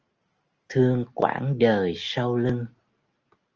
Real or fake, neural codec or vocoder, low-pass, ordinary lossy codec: real; none; 7.2 kHz; Opus, 24 kbps